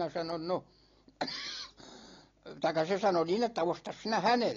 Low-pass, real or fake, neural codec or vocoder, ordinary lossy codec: 7.2 kHz; real; none; AAC, 32 kbps